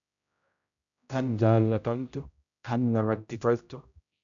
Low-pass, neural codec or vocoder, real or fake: 7.2 kHz; codec, 16 kHz, 0.5 kbps, X-Codec, HuBERT features, trained on general audio; fake